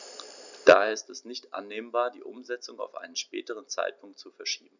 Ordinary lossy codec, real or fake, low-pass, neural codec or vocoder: none; real; 7.2 kHz; none